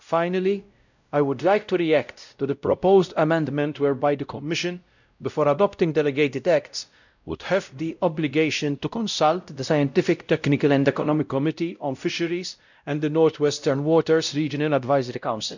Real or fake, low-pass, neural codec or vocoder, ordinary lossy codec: fake; 7.2 kHz; codec, 16 kHz, 0.5 kbps, X-Codec, WavLM features, trained on Multilingual LibriSpeech; none